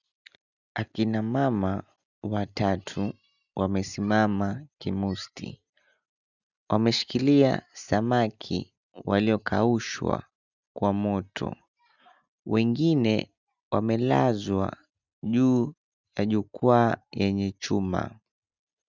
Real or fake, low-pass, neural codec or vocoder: real; 7.2 kHz; none